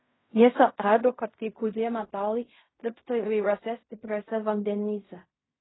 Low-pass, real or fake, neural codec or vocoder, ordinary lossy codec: 7.2 kHz; fake; codec, 16 kHz in and 24 kHz out, 0.4 kbps, LongCat-Audio-Codec, fine tuned four codebook decoder; AAC, 16 kbps